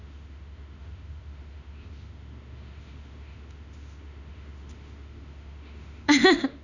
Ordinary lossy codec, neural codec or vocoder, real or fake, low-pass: Opus, 64 kbps; autoencoder, 48 kHz, 32 numbers a frame, DAC-VAE, trained on Japanese speech; fake; 7.2 kHz